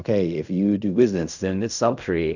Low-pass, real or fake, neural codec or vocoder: 7.2 kHz; fake; codec, 16 kHz in and 24 kHz out, 0.4 kbps, LongCat-Audio-Codec, fine tuned four codebook decoder